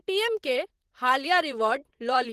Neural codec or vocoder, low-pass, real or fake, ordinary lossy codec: vocoder, 44.1 kHz, 128 mel bands, Pupu-Vocoder; 14.4 kHz; fake; Opus, 16 kbps